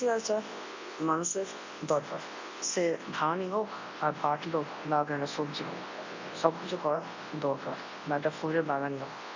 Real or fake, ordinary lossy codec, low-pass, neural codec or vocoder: fake; MP3, 32 kbps; 7.2 kHz; codec, 24 kHz, 0.9 kbps, WavTokenizer, large speech release